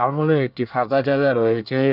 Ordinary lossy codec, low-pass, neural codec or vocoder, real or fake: none; 5.4 kHz; codec, 24 kHz, 1 kbps, SNAC; fake